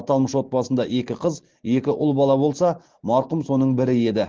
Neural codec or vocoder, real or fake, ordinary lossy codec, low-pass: none; real; Opus, 16 kbps; 7.2 kHz